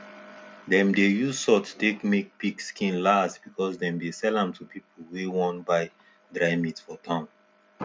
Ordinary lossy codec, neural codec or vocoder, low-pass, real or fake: none; none; none; real